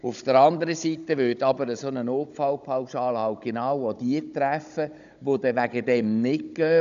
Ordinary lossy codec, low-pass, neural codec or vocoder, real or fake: none; 7.2 kHz; codec, 16 kHz, 16 kbps, FunCodec, trained on Chinese and English, 50 frames a second; fake